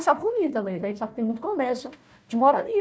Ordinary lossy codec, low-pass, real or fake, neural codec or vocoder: none; none; fake; codec, 16 kHz, 1 kbps, FunCodec, trained on Chinese and English, 50 frames a second